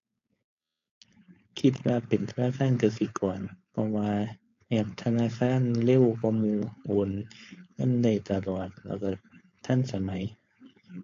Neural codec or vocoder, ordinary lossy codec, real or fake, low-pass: codec, 16 kHz, 4.8 kbps, FACodec; AAC, 48 kbps; fake; 7.2 kHz